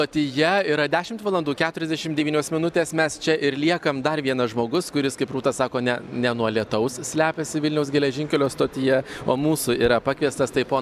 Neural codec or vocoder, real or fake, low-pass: none; real; 14.4 kHz